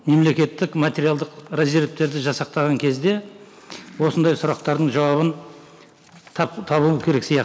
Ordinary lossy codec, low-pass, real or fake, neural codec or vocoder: none; none; real; none